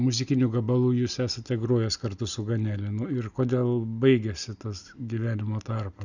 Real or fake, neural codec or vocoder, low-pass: real; none; 7.2 kHz